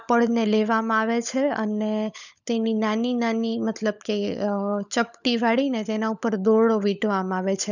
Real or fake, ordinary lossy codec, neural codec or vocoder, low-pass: fake; none; codec, 16 kHz, 16 kbps, FunCodec, trained on LibriTTS, 50 frames a second; 7.2 kHz